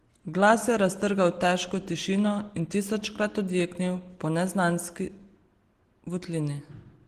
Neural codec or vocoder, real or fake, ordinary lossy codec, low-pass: none; real; Opus, 16 kbps; 14.4 kHz